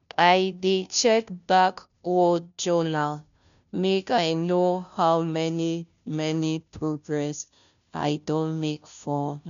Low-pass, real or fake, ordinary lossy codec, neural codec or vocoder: 7.2 kHz; fake; none; codec, 16 kHz, 0.5 kbps, FunCodec, trained on Chinese and English, 25 frames a second